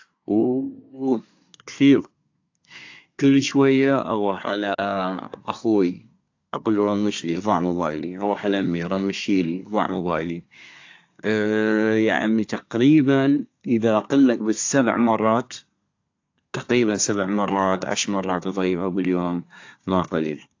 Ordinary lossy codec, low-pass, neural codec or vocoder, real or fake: AAC, 48 kbps; 7.2 kHz; codec, 24 kHz, 1 kbps, SNAC; fake